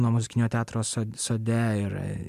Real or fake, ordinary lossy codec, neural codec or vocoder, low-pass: real; MP3, 96 kbps; none; 14.4 kHz